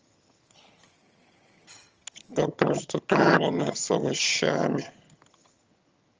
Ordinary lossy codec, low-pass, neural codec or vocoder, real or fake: Opus, 24 kbps; 7.2 kHz; vocoder, 22.05 kHz, 80 mel bands, HiFi-GAN; fake